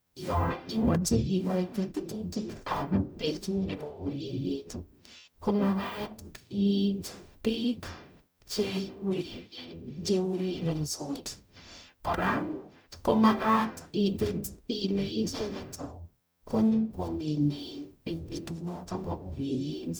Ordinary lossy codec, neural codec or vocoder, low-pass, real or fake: none; codec, 44.1 kHz, 0.9 kbps, DAC; none; fake